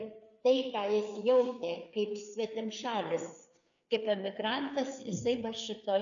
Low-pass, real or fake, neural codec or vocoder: 7.2 kHz; fake; codec, 16 kHz, 4 kbps, FreqCodec, larger model